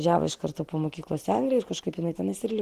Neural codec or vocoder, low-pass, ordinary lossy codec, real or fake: none; 14.4 kHz; Opus, 16 kbps; real